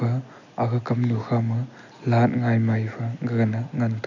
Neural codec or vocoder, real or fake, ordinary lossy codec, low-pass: none; real; none; 7.2 kHz